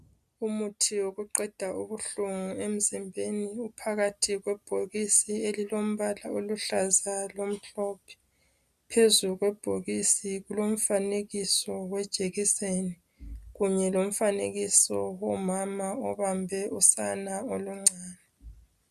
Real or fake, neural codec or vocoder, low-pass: real; none; 14.4 kHz